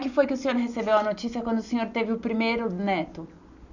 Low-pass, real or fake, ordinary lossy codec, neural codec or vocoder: 7.2 kHz; real; none; none